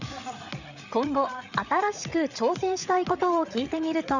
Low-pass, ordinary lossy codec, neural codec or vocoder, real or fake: 7.2 kHz; none; codec, 16 kHz, 8 kbps, FreqCodec, larger model; fake